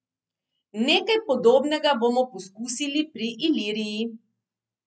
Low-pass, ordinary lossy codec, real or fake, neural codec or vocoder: none; none; real; none